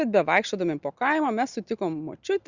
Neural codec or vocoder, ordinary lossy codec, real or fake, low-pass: none; Opus, 64 kbps; real; 7.2 kHz